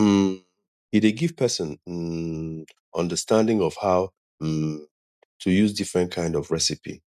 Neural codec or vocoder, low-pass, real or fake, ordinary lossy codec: none; 14.4 kHz; real; none